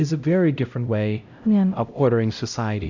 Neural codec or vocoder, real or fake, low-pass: codec, 16 kHz, 0.5 kbps, X-Codec, HuBERT features, trained on LibriSpeech; fake; 7.2 kHz